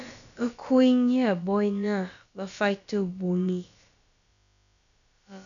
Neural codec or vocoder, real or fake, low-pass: codec, 16 kHz, about 1 kbps, DyCAST, with the encoder's durations; fake; 7.2 kHz